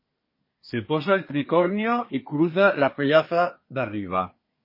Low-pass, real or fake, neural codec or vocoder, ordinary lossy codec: 5.4 kHz; fake; codec, 24 kHz, 1 kbps, SNAC; MP3, 24 kbps